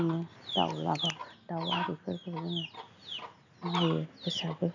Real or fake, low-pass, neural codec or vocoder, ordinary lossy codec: real; 7.2 kHz; none; none